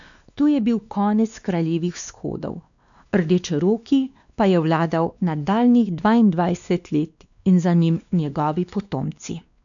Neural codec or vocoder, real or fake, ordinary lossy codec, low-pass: codec, 16 kHz, 2 kbps, X-Codec, WavLM features, trained on Multilingual LibriSpeech; fake; none; 7.2 kHz